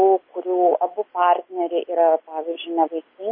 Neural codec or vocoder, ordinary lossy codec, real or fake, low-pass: none; MP3, 24 kbps; real; 5.4 kHz